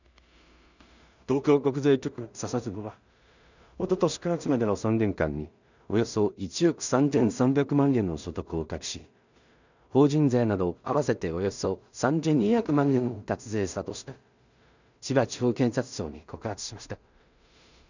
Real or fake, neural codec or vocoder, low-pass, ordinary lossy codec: fake; codec, 16 kHz in and 24 kHz out, 0.4 kbps, LongCat-Audio-Codec, two codebook decoder; 7.2 kHz; none